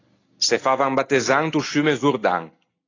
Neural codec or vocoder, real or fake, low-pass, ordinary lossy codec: none; real; 7.2 kHz; AAC, 32 kbps